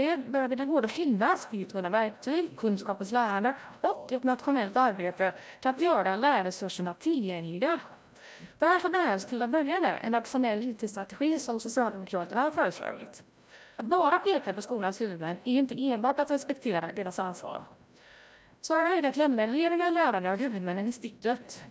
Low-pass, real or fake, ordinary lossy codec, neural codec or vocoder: none; fake; none; codec, 16 kHz, 0.5 kbps, FreqCodec, larger model